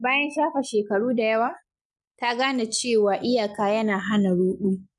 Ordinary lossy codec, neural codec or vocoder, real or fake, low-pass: none; none; real; 10.8 kHz